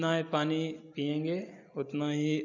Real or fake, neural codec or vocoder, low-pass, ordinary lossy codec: real; none; 7.2 kHz; none